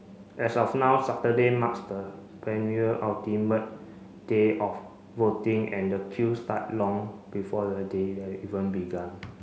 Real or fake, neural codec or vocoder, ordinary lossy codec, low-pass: real; none; none; none